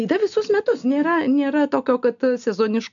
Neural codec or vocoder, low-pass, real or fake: none; 7.2 kHz; real